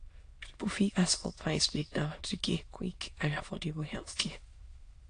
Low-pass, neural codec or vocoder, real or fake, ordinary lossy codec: 9.9 kHz; autoencoder, 22.05 kHz, a latent of 192 numbers a frame, VITS, trained on many speakers; fake; AAC, 48 kbps